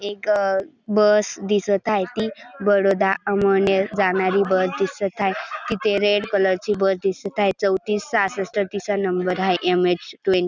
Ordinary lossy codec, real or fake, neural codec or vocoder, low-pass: none; real; none; none